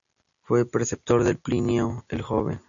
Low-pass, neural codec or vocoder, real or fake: 7.2 kHz; none; real